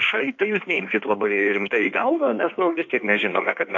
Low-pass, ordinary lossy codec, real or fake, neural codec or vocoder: 7.2 kHz; AAC, 48 kbps; fake; codec, 16 kHz in and 24 kHz out, 1.1 kbps, FireRedTTS-2 codec